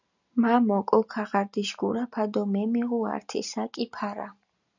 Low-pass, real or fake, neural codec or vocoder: 7.2 kHz; real; none